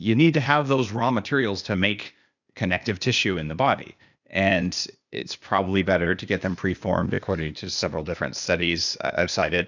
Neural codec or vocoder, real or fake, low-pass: codec, 16 kHz, 0.8 kbps, ZipCodec; fake; 7.2 kHz